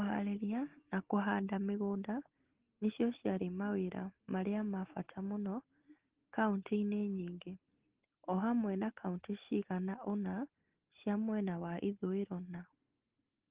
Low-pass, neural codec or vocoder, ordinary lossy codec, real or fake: 3.6 kHz; none; Opus, 16 kbps; real